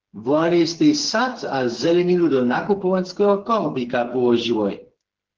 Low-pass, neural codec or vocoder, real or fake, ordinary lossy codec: 7.2 kHz; codec, 16 kHz, 4 kbps, FreqCodec, smaller model; fake; Opus, 16 kbps